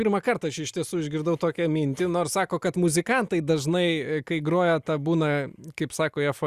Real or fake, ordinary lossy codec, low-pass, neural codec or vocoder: real; Opus, 64 kbps; 14.4 kHz; none